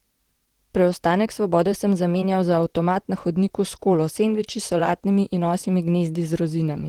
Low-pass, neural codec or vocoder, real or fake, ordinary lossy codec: 19.8 kHz; vocoder, 44.1 kHz, 128 mel bands, Pupu-Vocoder; fake; Opus, 16 kbps